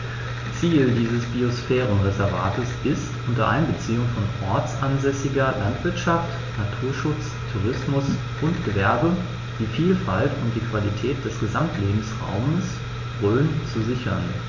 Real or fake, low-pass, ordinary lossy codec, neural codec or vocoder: real; 7.2 kHz; MP3, 48 kbps; none